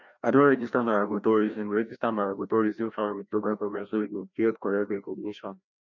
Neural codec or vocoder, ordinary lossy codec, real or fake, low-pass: codec, 16 kHz, 1 kbps, FreqCodec, larger model; none; fake; 7.2 kHz